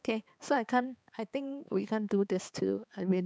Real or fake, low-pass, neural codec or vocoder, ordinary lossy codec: fake; none; codec, 16 kHz, 4 kbps, X-Codec, HuBERT features, trained on balanced general audio; none